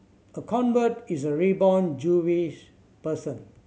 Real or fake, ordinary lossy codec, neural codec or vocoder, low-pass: real; none; none; none